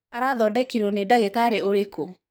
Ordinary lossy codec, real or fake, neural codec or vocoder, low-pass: none; fake; codec, 44.1 kHz, 2.6 kbps, SNAC; none